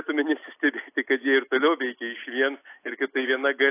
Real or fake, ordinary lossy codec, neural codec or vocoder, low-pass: real; AAC, 24 kbps; none; 3.6 kHz